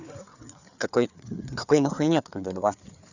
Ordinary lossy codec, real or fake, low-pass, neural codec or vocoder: none; fake; 7.2 kHz; codec, 16 kHz, 4 kbps, FreqCodec, larger model